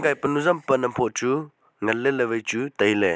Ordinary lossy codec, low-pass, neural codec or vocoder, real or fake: none; none; none; real